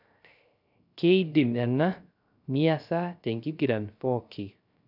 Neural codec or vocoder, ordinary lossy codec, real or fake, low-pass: codec, 16 kHz, 0.3 kbps, FocalCodec; none; fake; 5.4 kHz